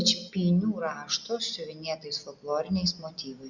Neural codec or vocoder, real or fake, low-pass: none; real; 7.2 kHz